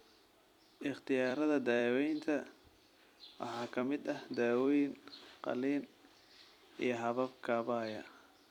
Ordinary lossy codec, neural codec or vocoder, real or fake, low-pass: none; vocoder, 44.1 kHz, 128 mel bands every 256 samples, BigVGAN v2; fake; 19.8 kHz